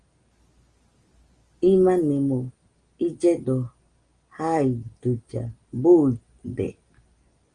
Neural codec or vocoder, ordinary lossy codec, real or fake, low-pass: none; Opus, 32 kbps; real; 9.9 kHz